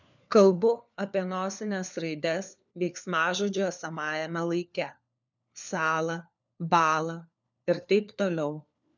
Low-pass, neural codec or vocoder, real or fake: 7.2 kHz; codec, 16 kHz, 4 kbps, FunCodec, trained on LibriTTS, 50 frames a second; fake